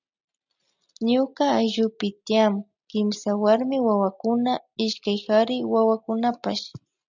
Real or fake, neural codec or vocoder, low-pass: real; none; 7.2 kHz